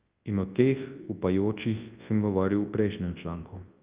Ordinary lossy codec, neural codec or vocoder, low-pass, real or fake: Opus, 32 kbps; codec, 24 kHz, 0.9 kbps, WavTokenizer, large speech release; 3.6 kHz; fake